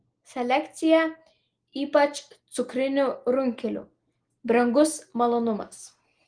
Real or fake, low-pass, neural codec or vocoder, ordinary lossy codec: real; 9.9 kHz; none; Opus, 24 kbps